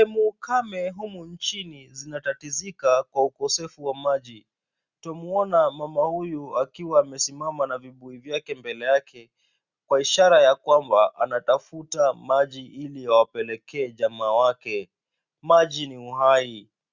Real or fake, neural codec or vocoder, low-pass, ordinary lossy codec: real; none; 7.2 kHz; Opus, 64 kbps